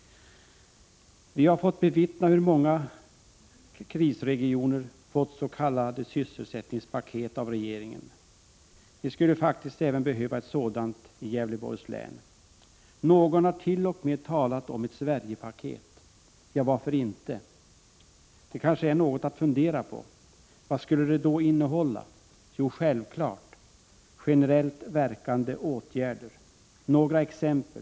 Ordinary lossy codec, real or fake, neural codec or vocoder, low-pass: none; real; none; none